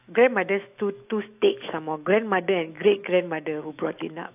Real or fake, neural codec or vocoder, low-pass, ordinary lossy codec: fake; autoencoder, 48 kHz, 128 numbers a frame, DAC-VAE, trained on Japanese speech; 3.6 kHz; AAC, 32 kbps